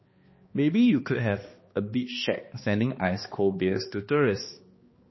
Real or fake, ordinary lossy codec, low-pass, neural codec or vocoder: fake; MP3, 24 kbps; 7.2 kHz; codec, 16 kHz, 2 kbps, X-Codec, HuBERT features, trained on balanced general audio